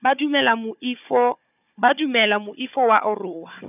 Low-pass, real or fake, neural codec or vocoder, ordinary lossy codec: 3.6 kHz; fake; codec, 16 kHz, 4 kbps, FunCodec, trained on Chinese and English, 50 frames a second; none